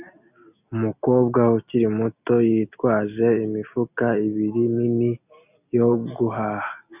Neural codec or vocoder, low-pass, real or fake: none; 3.6 kHz; real